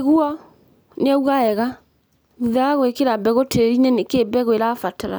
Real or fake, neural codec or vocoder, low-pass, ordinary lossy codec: real; none; none; none